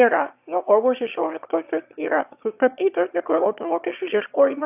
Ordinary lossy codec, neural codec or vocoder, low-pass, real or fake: AAC, 32 kbps; autoencoder, 22.05 kHz, a latent of 192 numbers a frame, VITS, trained on one speaker; 3.6 kHz; fake